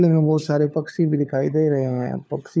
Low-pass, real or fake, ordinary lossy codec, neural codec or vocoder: none; fake; none; codec, 16 kHz, 4 kbps, FunCodec, trained on LibriTTS, 50 frames a second